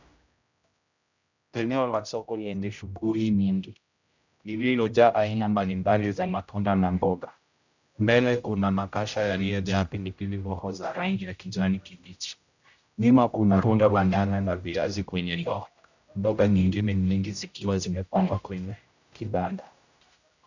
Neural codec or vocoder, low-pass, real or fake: codec, 16 kHz, 0.5 kbps, X-Codec, HuBERT features, trained on general audio; 7.2 kHz; fake